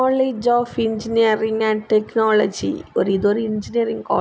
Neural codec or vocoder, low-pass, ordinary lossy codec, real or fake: none; none; none; real